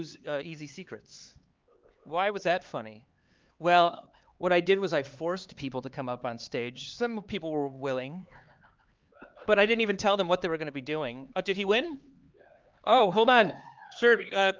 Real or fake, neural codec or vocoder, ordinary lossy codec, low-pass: fake; codec, 16 kHz, 4 kbps, X-Codec, HuBERT features, trained on LibriSpeech; Opus, 32 kbps; 7.2 kHz